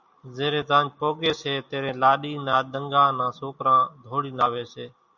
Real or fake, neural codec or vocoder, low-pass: real; none; 7.2 kHz